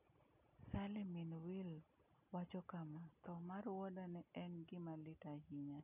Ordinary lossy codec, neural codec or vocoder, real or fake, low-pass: none; none; real; 3.6 kHz